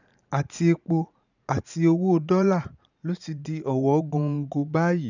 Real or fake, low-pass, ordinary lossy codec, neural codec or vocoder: fake; 7.2 kHz; none; vocoder, 44.1 kHz, 80 mel bands, Vocos